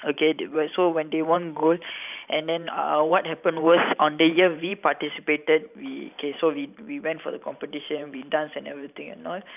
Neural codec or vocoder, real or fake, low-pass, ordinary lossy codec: vocoder, 44.1 kHz, 128 mel bands every 512 samples, BigVGAN v2; fake; 3.6 kHz; none